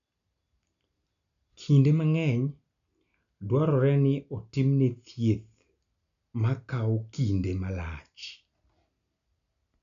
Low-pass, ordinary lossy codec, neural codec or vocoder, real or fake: 7.2 kHz; none; none; real